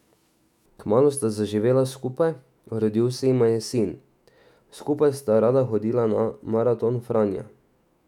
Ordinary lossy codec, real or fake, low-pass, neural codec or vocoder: none; fake; 19.8 kHz; autoencoder, 48 kHz, 128 numbers a frame, DAC-VAE, trained on Japanese speech